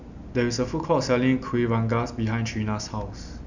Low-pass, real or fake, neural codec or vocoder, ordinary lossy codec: 7.2 kHz; real; none; none